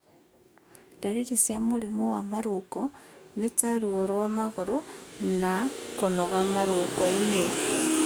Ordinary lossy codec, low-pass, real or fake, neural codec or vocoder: none; none; fake; codec, 44.1 kHz, 2.6 kbps, DAC